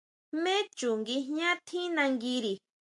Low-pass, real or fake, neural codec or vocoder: 10.8 kHz; real; none